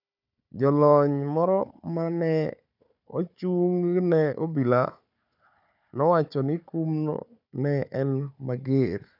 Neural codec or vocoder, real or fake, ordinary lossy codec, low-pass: codec, 16 kHz, 4 kbps, FunCodec, trained on Chinese and English, 50 frames a second; fake; none; 5.4 kHz